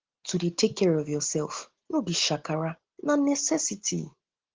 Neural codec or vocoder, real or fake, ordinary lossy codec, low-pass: none; real; Opus, 16 kbps; 7.2 kHz